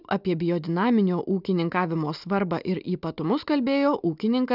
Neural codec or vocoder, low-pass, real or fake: none; 5.4 kHz; real